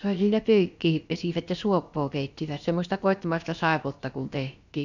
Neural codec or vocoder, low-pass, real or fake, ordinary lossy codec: codec, 16 kHz, about 1 kbps, DyCAST, with the encoder's durations; 7.2 kHz; fake; none